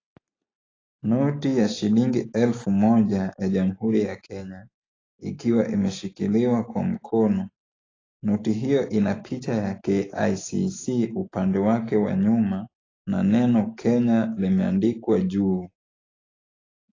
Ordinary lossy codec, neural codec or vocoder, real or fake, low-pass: AAC, 32 kbps; none; real; 7.2 kHz